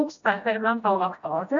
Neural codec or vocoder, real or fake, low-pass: codec, 16 kHz, 1 kbps, FreqCodec, smaller model; fake; 7.2 kHz